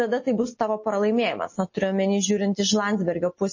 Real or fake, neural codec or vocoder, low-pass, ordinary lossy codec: real; none; 7.2 kHz; MP3, 32 kbps